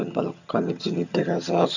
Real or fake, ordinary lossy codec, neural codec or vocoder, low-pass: fake; none; vocoder, 22.05 kHz, 80 mel bands, HiFi-GAN; 7.2 kHz